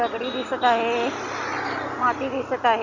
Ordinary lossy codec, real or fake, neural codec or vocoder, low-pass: none; real; none; 7.2 kHz